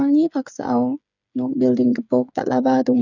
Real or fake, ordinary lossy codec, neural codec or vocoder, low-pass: fake; none; codec, 16 kHz, 8 kbps, FreqCodec, smaller model; 7.2 kHz